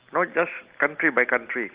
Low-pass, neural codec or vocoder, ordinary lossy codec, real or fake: 3.6 kHz; none; Opus, 16 kbps; real